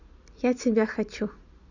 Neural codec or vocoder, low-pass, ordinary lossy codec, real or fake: none; 7.2 kHz; none; real